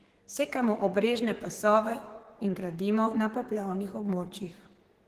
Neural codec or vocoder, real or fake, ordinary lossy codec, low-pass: codec, 32 kHz, 1.9 kbps, SNAC; fake; Opus, 16 kbps; 14.4 kHz